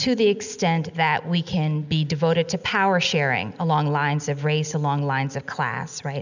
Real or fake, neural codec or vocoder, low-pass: real; none; 7.2 kHz